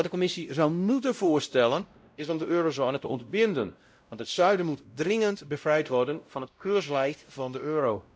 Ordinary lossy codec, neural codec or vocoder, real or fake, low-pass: none; codec, 16 kHz, 0.5 kbps, X-Codec, WavLM features, trained on Multilingual LibriSpeech; fake; none